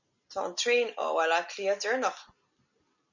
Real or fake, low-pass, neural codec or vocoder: real; 7.2 kHz; none